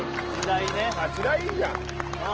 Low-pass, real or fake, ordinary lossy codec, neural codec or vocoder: 7.2 kHz; real; Opus, 16 kbps; none